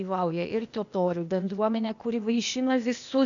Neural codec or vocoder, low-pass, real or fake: codec, 16 kHz, 0.8 kbps, ZipCodec; 7.2 kHz; fake